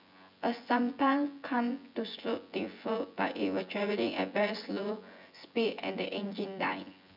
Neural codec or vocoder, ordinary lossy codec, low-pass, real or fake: vocoder, 24 kHz, 100 mel bands, Vocos; none; 5.4 kHz; fake